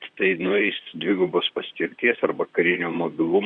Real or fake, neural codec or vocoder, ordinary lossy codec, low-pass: fake; vocoder, 44.1 kHz, 128 mel bands, Pupu-Vocoder; Opus, 64 kbps; 9.9 kHz